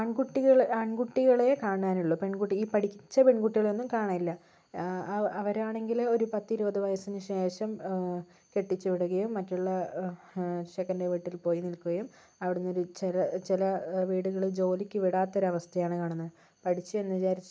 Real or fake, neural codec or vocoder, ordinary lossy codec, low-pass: real; none; none; none